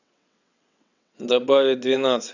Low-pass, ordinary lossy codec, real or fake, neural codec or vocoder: 7.2 kHz; none; fake; vocoder, 22.05 kHz, 80 mel bands, WaveNeXt